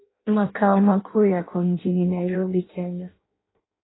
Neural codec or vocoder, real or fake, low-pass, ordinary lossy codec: codec, 16 kHz in and 24 kHz out, 0.6 kbps, FireRedTTS-2 codec; fake; 7.2 kHz; AAC, 16 kbps